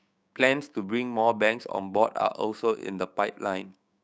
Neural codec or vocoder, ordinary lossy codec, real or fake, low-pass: codec, 16 kHz, 6 kbps, DAC; none; fake; none